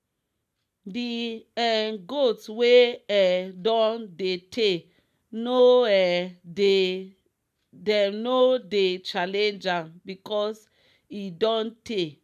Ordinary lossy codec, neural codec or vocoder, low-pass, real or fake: none; none; 14.4 kHz; real